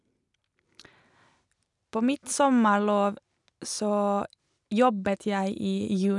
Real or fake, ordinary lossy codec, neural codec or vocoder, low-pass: real; none; none; 10.8 kHz